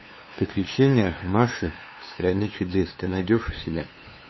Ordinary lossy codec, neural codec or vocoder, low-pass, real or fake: MP3, 24 kbps; codec, 16 kHz, 2 kbps, FunCodec, trained on LibriTTS, 25 frames a second; 7.2 kHz; fake